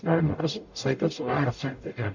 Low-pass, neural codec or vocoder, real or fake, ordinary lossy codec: 7.2 kHz; codec, 44.1 kHz, 0.9 kbps, DAC; fake; none